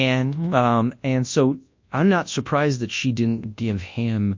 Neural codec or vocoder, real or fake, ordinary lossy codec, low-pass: codec, 24 kHz, 0.9 kbps, WavTokenizer, large speech release; fake; MP3, 48 kbps; 7.2 kHz